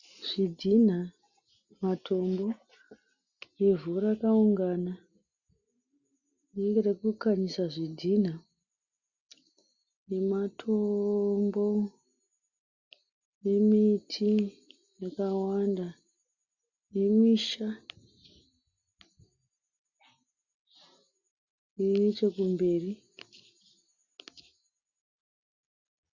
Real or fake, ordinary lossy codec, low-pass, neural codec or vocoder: real; Opus, 64 kbps; 7.2 kHz; none